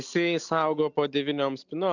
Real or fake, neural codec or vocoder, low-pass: real; none; 7.2 kHz